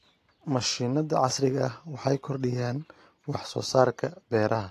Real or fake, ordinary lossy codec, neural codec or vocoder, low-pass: real; AAC, 48 kbps; none; 14.4 kHz